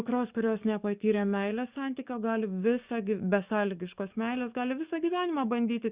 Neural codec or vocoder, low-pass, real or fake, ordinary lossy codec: codec, 44.1 kHz, 7.8 kbps, DAC; 3.6 kHz; fake; Opus, 64 kbps